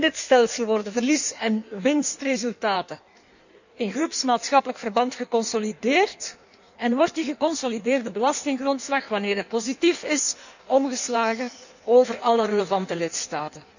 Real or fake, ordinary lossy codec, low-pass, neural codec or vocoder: fake; none; 7.2 kHz; codec, 16 kHz in and 24 kHz out, 1.1 kbps, FireRedTTS-2 codec